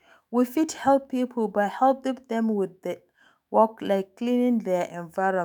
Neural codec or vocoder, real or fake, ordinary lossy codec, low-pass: autoencoder, 48 kHz, 128 numbers a frame, DAC-VAE, trained on Japanese speech; fake; none; none